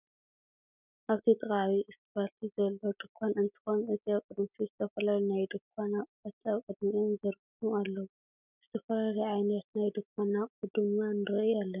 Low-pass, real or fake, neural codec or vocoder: 3.6 kHz; real; none